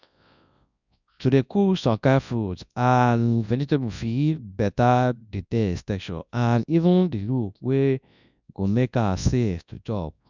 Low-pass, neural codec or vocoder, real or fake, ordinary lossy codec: 7.2 kHz; codec, 24 kHz, 0.9 kbps, WavTokenizer, large speech release; fake; none